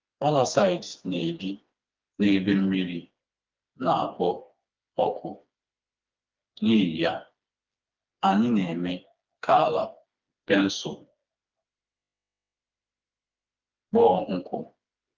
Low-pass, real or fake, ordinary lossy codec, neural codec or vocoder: 7.2 kHz; fake; Opus, 32 kbps; codec, 16 kHz, 2 kbps, FreqCodec, smaller model